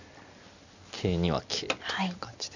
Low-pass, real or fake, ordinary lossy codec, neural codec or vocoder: 7.2 kHz; real; none; none